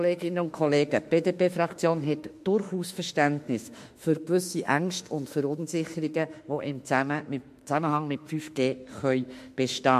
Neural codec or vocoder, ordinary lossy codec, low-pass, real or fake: autoencoder, 48 kHz, 32 numbers a frame, DAC-VAE, trained on Japanese speech; MP3, 64 kbps; 14.4 kHz; fake